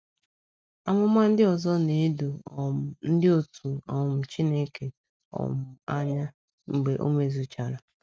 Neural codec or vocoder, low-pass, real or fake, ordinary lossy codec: none; none; real; none